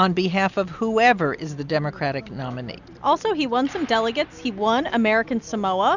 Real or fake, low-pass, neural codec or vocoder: real; 7.2 kHz; none